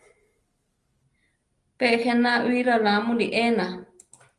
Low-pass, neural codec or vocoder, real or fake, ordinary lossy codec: 10.8 kHz; none; real; Opus, 32 kbps